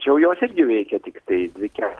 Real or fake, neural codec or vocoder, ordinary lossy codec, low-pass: real; none; Opus, 16 kbps; 10.8 kHz